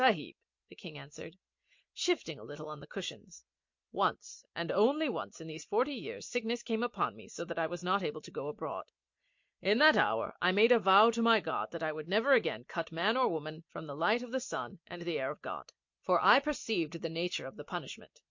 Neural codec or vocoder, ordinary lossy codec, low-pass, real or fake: none; MP3, 48 kbps; 7.2 kHz; real